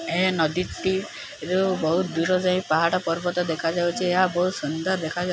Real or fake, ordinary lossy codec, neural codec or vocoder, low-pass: real; none; none; none